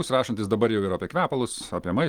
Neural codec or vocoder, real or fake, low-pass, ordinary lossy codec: none; real; 19.8 kHz; Opus, 24 kbps